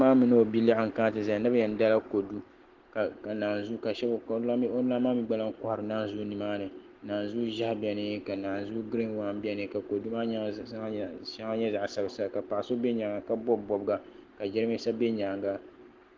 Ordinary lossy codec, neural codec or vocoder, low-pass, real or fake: Opus, 16 kbps; none; 7.2 kHz; real